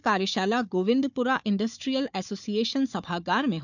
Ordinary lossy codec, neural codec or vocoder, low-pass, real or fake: none; codec, 16 kHz, 4 kbps, FunCodec, trained on Chinese and English, 50 frames a second; 7.2 kHz; fake